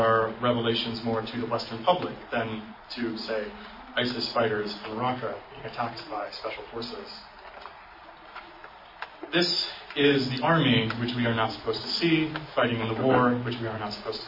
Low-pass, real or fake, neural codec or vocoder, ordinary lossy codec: 5.4 kHz; real; none; MP3, 24 kbps